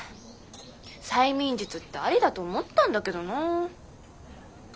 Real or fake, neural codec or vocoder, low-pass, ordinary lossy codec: real; none; none; none